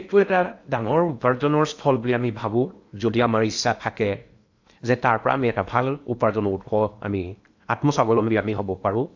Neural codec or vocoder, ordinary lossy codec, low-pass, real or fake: codec, 16 kHz in and 24 kHz out, 0.8 kbps, FocalCodec, streaming, 65536 codes; AAC, 48 kbps; 7.2 kHz; fake